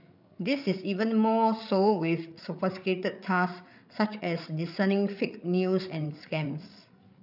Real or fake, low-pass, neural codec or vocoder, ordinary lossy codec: fake; 5.4 kHz; codec, 16 kHz, 8 kbps, FreqCodec, larger model; none